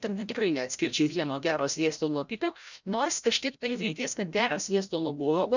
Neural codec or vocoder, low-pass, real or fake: codec, 16 kHz, 0.5 kbps, FreqCodec, larger model; 7.2 kHz; fake